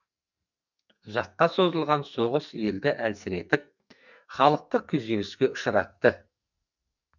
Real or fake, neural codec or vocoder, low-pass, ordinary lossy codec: fake; codec, 44.1 kHz, 2.6 kbps, SNAC; 7.2 kHz; none